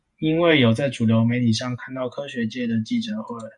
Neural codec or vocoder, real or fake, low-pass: vocoder, 48 kHz, 128 mel bands, Vocos; fake; 10.8 kHz